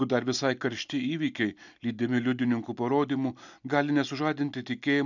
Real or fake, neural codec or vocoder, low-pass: real; none; 7.2 kHz